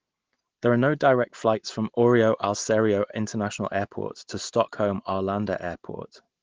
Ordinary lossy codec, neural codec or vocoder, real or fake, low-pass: Opus, 16 kbps; none; real; 7.2 kHz